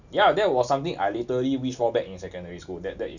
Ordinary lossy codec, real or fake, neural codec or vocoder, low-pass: none; real; none; 7.2 kHz